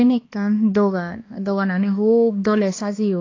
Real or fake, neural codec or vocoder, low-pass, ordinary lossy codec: fake; codec, 16 kHz, 2 kbps, X-Codec, HuBERT features, trained on balanced general audio; 7.2 kHz; AAC, 32 kbps